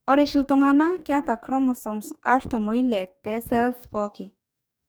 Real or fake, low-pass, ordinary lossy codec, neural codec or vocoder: fake; none; none; codec, 44.1 kHz, 2.6 kbps, DAC